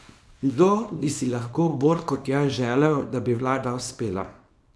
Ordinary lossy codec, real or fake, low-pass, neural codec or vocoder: none; fake; none; codec, 24 kHz, 0.9 kbps, WavTokenizer, small release